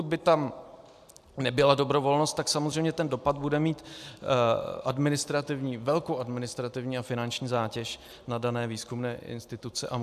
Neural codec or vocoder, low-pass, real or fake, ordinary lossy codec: none; 14.4 kHz; real; AAC, 96 kbps